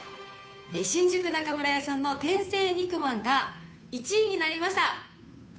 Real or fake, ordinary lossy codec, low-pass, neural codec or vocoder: fake; none; none; codec, 16 kHz, 2 kbps, FunCodec, trained on Chinese and English, 25 frames a second